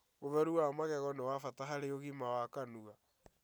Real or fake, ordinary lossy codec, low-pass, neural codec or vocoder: real; none; none; none